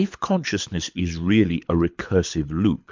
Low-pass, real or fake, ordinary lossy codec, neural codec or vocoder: 7.2 kHz; fake; MP3, 64 kbps; codec, 24 kHz, 6 kbps, HILCodec